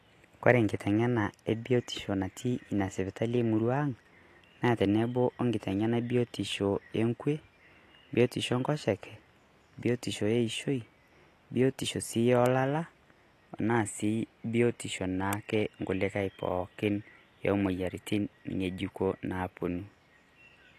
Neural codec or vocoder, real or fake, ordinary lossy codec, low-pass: none; real; AAC, 48 kbps; 14.4 kHz